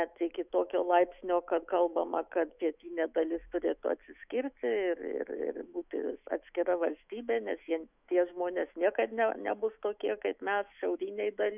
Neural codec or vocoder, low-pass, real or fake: autoencoder, 48 kHz, 128 numbers a frame, DAC-VAE, trained on Japanese speech; 3.6 kHz; fake